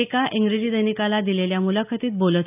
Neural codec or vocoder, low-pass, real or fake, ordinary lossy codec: none; 3.6 kHz; real; none